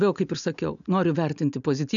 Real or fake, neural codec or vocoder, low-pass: real; none; 7.2 kHz